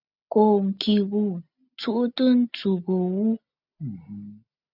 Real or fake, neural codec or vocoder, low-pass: real; none; 5.4 kHz